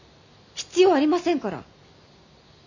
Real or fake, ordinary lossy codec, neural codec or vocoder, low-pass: real; none; none; 7.2 kHz